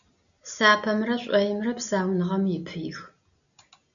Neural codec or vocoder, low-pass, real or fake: none; 7.2 kHz; real